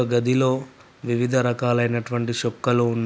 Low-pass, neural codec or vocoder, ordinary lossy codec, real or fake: none; none; none; real